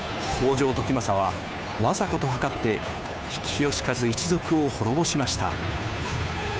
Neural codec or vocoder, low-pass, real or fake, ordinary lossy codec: codec, 16 kHz, 2 kbps, FunCodec, trained on Chinese and English, 25 frames a second; none; fake; none